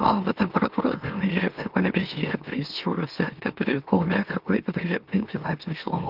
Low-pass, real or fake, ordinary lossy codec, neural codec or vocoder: 5.4 kHz; fake; Opus, 32 kbps; autoencoder, 44.1 kHz, a latent of 192 numbers a frame, MeloTTS